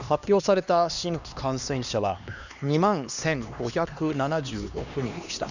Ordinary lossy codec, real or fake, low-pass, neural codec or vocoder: none; fake; 7.2 kHz; codec, 16 kHz, 2 kbps, X-Codec, HuBERT features, trained on LibriSpeech